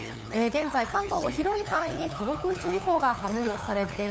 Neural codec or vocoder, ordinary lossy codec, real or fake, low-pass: codec, 16 kHz, 8 kbps, FunCodec, trained on LibriTTS, 25 frames a second; none; fake; none